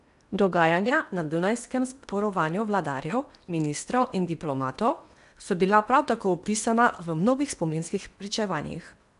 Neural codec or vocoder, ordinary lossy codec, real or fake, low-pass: codec, 16 kHz in and 24 kHz out, 0.8 kbps, FocalCodec, streaming, 65536 codes; none; fake; 10.8 kHz